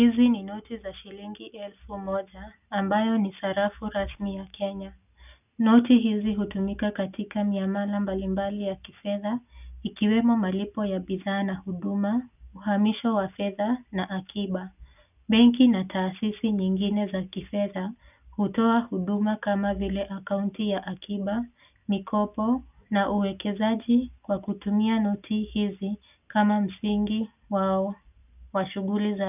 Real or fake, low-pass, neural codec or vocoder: real; 3.6 kHz; none